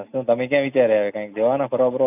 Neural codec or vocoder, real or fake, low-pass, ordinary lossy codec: none; real; 3.6 kHz; none